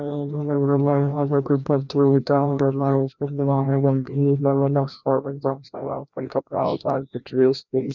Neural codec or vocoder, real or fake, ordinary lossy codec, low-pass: codec, 16 kHz, 1 kbps, FreqCodec, larger model; fake; none; 7.2 kHz